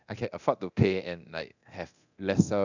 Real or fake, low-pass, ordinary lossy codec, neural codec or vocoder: fake; 7.2 kHz; none; codec, 24 kHz, 0.9 kbps, DualCodec